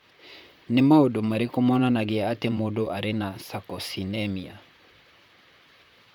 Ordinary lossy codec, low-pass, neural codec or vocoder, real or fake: none; 19.8 kHz; vocoder, 44.1 kHz, 128 mel bands, Pupu-Vocoder; fake